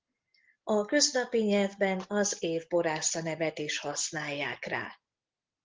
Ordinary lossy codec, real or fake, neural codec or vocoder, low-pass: Opus, 16 kbps; real; none; 7.2 kHz